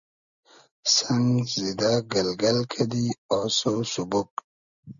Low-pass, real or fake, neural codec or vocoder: 7.2 kHz; real; none